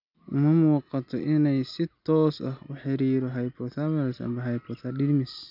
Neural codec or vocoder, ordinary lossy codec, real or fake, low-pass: none; none; real; 5.4 kHz